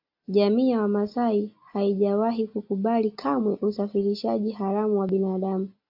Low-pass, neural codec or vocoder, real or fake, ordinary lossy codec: 5.4 kHz; none; real; AAC, 48 kbps